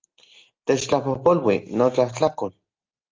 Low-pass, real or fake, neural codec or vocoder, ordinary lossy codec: 7.2 kHz; real; none; Opus, 24 kbps